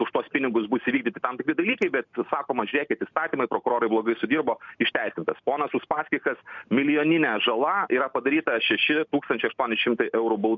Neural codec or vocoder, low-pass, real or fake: none; 7.2 kHz; real